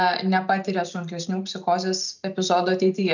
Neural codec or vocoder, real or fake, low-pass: none; real; 7.2 kHz